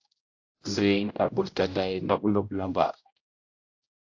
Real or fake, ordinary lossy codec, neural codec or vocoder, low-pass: fake; AAC, 48 kbps; codec, 16 kHz, 0.5 kbps, X-Codec, HuBERT features, trained on general audio; 7.2 kHz